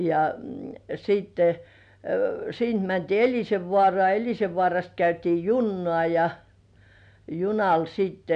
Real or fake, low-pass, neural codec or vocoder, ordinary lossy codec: real; 9.9 kHz; none; none